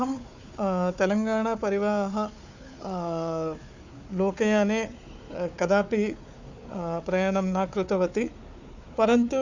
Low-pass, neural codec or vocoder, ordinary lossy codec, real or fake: 7.2 kHz; codec, 16 kHz, 4 kbps, FunCodec, trained on Chinese and English, 50 frames a second; none; fake